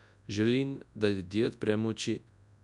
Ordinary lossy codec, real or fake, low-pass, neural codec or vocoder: none; fake; 10.8 kHz; codec, 24 kHz, 0.9 kbps, WavTokenizer, large speech release